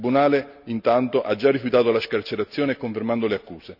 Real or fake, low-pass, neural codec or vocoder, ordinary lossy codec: real; 5.4 kHz; none; none